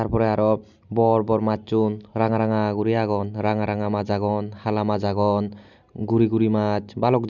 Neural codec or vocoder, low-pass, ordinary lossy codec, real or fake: none; 7.2 kHz; none; real